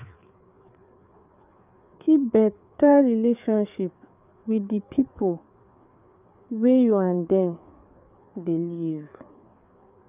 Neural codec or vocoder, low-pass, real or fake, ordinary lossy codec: codec, 16 kHz, 4 kbps, FreqCodec, larger model; 3.6 kHz; fake; none